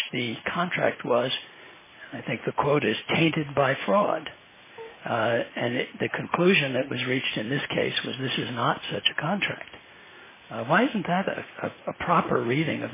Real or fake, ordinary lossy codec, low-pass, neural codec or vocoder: real; MP3, 16 kbps; 3.6 kHz; none